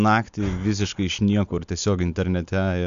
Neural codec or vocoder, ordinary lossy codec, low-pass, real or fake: none; MP3, 64 kbps; 7.2 kHz; real